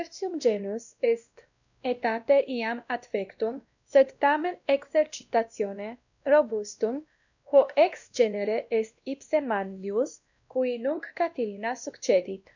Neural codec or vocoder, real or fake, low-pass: codec, 16 kHz, 1 kbps, X-Codec, WavLM features, trained on Multilingual LibriSpeech; fake; 7.2 kHz